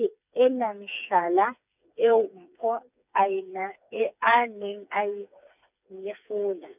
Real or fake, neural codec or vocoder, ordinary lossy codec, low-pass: fake; codec, 16 kHz, 4 kbps, FreqCodec, smaller model; none; 3.6 kHz